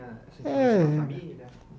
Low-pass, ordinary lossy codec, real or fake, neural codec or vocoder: none; none; real; none